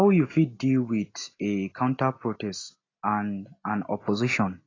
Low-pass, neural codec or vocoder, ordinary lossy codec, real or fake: 7.2 kHz; none; AAC, 32 kbps; real